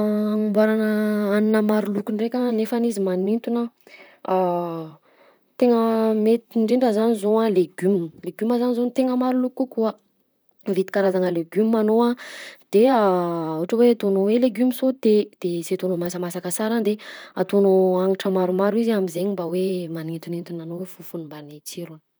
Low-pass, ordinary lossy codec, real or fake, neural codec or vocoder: none; none; fake; vocoder, 44.1 kHz, 128 mel bands, Pupu-Vocoder